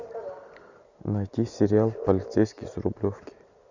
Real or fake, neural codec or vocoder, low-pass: real; none; 7.2 kHz